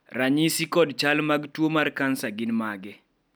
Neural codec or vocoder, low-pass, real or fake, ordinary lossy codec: none; none; real; none